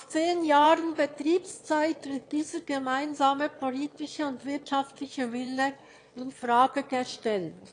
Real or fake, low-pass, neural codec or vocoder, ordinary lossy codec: fake; 9.9 kHz; autoencoder, 22.05 kHz, a latent of 192 numbers a frame, VITS, trained on one speaker; AAC, 48 kbps